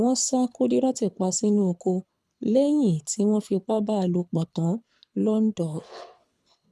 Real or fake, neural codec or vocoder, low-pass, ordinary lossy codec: fake; codec, 24 kHz, 6 kbps, HILCodec; none; none